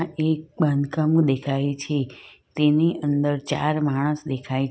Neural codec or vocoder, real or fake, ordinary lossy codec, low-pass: none; real; none; none